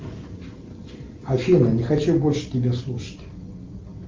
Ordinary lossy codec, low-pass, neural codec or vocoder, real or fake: Opus, 32 kbps; 7.2 kHz; none; real